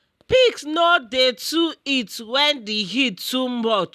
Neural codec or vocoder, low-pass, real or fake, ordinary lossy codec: none; 14.4 kHz; real; none